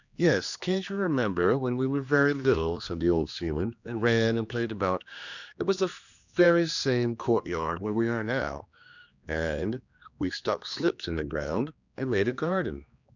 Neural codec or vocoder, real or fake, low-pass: codec, 16 kHz, 2 kbps, X-Codec, HuBERT features, trained on general audio; fake; 7.2 kHz